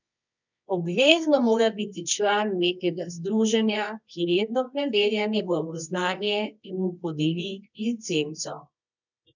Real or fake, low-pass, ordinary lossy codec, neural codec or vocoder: fake; 7.2 kHz; none; codec, 24 kHz, 0.9 kbps, WavTokenizer, medium music audio release